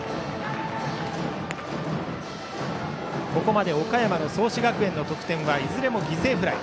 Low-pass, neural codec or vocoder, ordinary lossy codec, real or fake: none; none; none; real